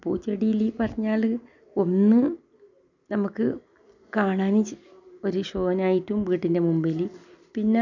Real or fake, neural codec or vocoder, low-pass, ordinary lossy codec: real; none; 7.2 kHz; none